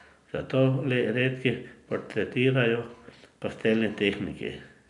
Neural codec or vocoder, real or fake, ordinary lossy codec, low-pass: none; real; MP3, 96 kbps; 10.8 kHz